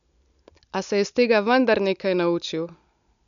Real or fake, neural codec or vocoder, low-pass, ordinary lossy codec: real; none; 7.2 kHz; none